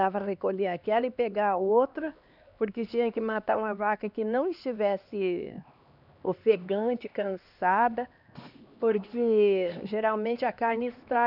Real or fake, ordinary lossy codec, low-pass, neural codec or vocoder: fake; none; 5.4 kHz; codec, 16 kHz, 2 kbps, X-Codec, HuBERT features, trained on LibriSpeech